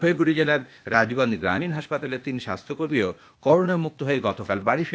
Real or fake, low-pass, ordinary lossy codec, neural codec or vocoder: fake; none; none; codec, 16 kHz, 0.8 kbps, ZipCodec